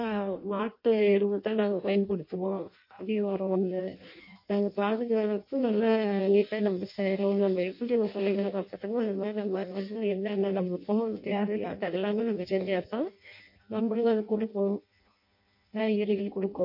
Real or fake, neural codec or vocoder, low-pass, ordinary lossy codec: fake; codec, 16 kHz in and 24 kHz out, 0.6 kbps, FireRedTTS-2 codec; 5.4 kHz; MP3, 32 kbps